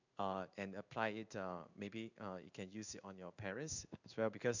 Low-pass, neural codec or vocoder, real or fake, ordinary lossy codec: 7.2 kHz; codec, 16 kHz in and 24 kHz out, 1 kbps, XY-Tokenizer; fake; none